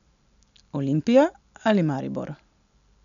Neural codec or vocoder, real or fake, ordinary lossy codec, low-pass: none; real; none; 7.2 kHz